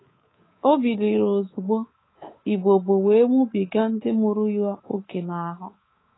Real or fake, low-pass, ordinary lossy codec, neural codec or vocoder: fake; 7.2 kHz; AAC, 16 kbps; codec, 24 kHz, 3.1 kbps, DualCodec